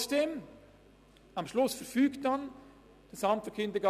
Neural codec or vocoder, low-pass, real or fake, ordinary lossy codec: none; 14.4 kHz; real; none